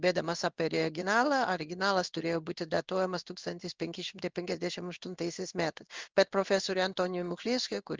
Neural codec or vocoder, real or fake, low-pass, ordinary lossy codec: codec, 16 kHz in and 24 kHz out, 1 kbps, XY-Tokenizer; fake; 7.2 kHz; Opus, 32 kbps